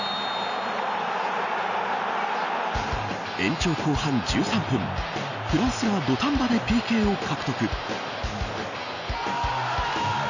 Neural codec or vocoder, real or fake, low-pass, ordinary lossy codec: none; real; 7.2 kHz; none